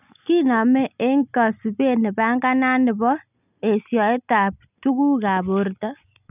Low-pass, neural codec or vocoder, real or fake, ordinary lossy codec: 3.6 kHz; none; real; none